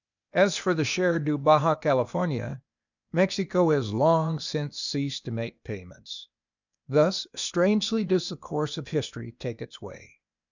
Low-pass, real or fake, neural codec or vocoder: 7.2 kHz; fake; codec, 16 kHz, 0.8 kbps, ZipCodec